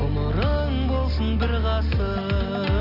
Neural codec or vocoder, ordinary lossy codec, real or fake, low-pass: none; none; real; 5.4 kHz